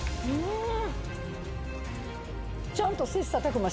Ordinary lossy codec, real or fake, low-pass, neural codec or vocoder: none; real; none; none